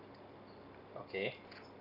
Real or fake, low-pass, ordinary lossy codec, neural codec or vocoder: real; 5.4 kHz; none; none